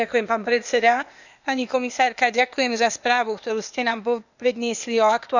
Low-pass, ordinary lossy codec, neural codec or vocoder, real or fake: 7.2 kHz; none; codec, 16 kHz, 0.8 kbps, ZipCodec; fake